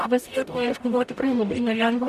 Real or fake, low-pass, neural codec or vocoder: fake; 14.4 kHz; codec, 44.1 kHz, 0.9 kbps, DAC